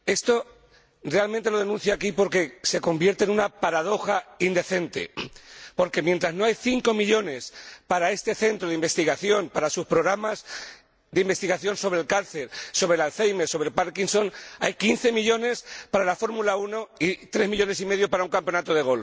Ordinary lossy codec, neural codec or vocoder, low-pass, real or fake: none; none; none; real